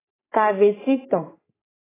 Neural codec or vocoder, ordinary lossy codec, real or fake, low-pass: codec, 44.1 kHz, 3.4 kbps, Pupu-Codec; AAC, 16 kbps; fake; 3.6 kHz